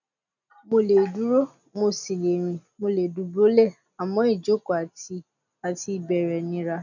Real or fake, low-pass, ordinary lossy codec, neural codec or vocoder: real; 7.2 kHz; none; none